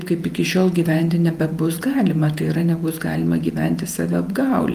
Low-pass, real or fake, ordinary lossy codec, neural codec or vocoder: 14.4 kHz; fake; Opus, 32 kbps; vocoder, 48 kHz, 128 mel bands, Vocos